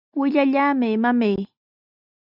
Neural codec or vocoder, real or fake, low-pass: none; real; 5.4 kHz